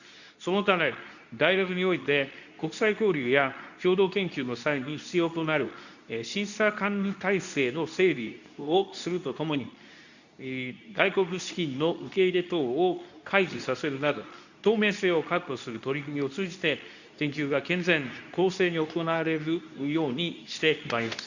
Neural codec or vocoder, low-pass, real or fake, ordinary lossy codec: codec, 24 kHz, 0.9 kbps, WavTokenizer, medium speech release version 2; 7.2 kHz; fake; none